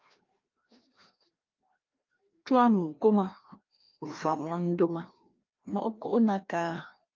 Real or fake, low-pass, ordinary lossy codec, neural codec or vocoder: fake; 7.2 kHz; Opus, 24 kbps; codec, 16 kHz, 1 kbps, FreqCodec, larger model